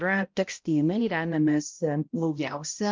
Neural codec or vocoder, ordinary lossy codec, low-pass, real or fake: codec, 16 kHz, 0.5 kbps, X-Codec, HuBERT features, trained on balanced general audio; Opus, 24 kbps; 7.2 kHz; fake